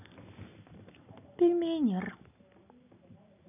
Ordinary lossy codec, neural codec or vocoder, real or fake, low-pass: none; none; real; 3.6 kHz